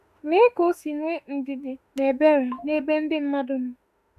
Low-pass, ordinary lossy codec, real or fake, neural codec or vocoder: 14.4 kHz; none; fake; autoencoder, 48 kHz, 32 numbers a frame, DAC-VAE, trained on Japanese speech